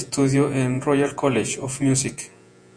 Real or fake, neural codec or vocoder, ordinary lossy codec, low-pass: fake; vocoder, 48 kHz, 128 mel bands, Vocos; Opus, 64 kbps; 9.9 kHz